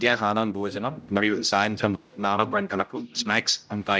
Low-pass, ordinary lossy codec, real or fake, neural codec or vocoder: none; none; fake; codec, 16 kHz, 0.5 kbps, X-Codec, HuBERT features, trained on general audio